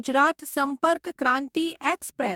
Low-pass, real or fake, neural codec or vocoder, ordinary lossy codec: 19.8 kHz; fake; codec, 44.1 kHz, 2.6 kbps, DAC; MP3, 96 kbps